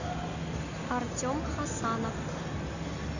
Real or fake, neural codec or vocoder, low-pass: real; none; 7.2 kHz